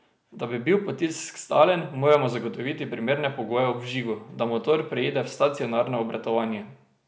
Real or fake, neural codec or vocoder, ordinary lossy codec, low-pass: real; none; none; none